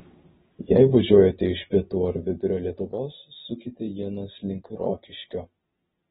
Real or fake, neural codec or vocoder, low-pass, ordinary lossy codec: real; none; 7.2 kHz; AAC, 16 kbps